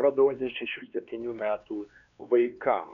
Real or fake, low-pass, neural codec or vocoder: fake; 7.2 kHz; codec, 16 kHz, 2 kbps, X-Codec, WavLM features, trained on Multilingual LibriSpeech